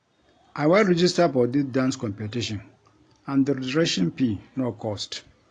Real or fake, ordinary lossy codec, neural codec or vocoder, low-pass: real; AAC, 48 kbps; none; 9.9 kHz